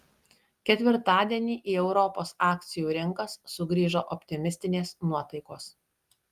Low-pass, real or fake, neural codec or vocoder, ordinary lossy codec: 14.4 kHz; real; none; Opus, 32 kbps